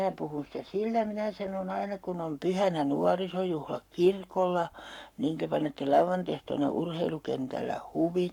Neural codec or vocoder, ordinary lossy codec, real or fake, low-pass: codec, 44.1 kHz, 7.8 kbps, Pupu-Codec; none; fake; 19.8 kHz